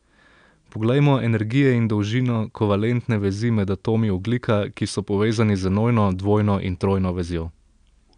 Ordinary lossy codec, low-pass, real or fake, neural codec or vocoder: none; 9.9 kHz; real; none